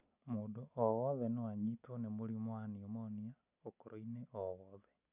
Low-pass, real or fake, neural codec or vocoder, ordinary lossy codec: 3.6 kHz; real; none; none